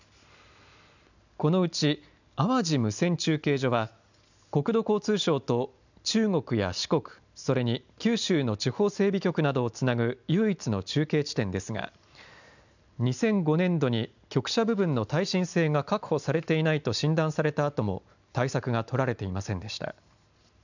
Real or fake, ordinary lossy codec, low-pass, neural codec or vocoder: real; none; 7.2 kHz; none